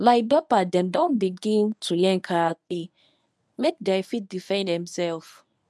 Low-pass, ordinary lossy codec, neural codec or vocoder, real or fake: none; none; codec, 24 kHz, 0.9 kbps, WavTokenizer, medium speech release version 1; fake